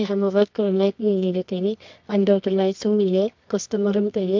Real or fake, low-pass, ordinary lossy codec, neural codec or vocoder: fake; 7.2 kHz; MP3, 64 kbps; codec, 24 kHz, 0.9 kbps, WavTokenizer, medium music audio release